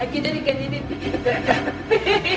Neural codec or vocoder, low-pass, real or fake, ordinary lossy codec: codec, 16 kHz, 0.4 kbps, LongCat-Audio-Codec; none; fake; none